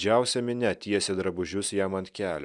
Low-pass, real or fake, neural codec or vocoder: 10.8 kHz; real; none